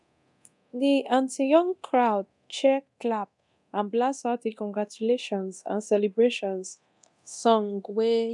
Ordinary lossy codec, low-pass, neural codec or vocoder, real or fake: none; 10.8 kHz; codec, 24 kHz, 0.9 kbps, DualCodec; fake